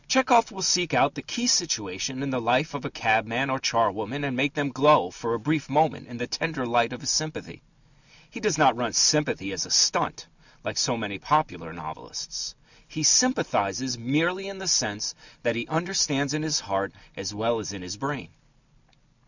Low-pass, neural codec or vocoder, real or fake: 7.2 kHz; none; real